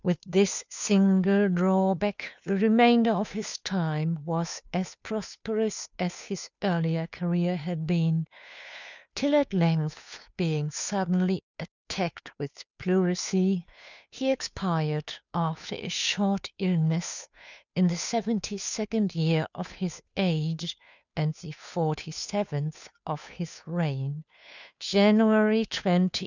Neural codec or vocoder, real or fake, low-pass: codec, 16 kHz, 2 kbps, FunCodec, trained on Chinese and English, 25 frames a second; fake; 7.2 kHz